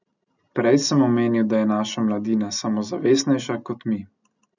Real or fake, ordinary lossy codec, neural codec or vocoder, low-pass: real; none; none; 7.2 kHz